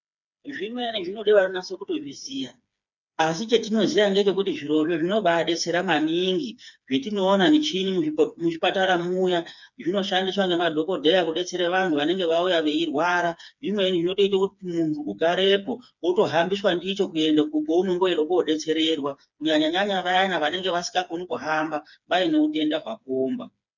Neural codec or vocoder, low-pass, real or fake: codec, 16 kHz, 4 kbps, FreqCodec, smaller model; 7.2 kHz; fake